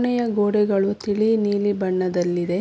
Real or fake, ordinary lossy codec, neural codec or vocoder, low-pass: real; none; none; none